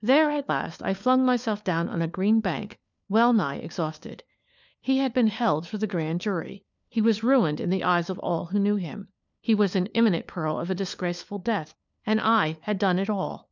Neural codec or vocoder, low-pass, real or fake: codec, 16 kHz, 4 kbps, FunCodec, trained on LibriTTS, 50 frames a second; 7.2 kHz; fake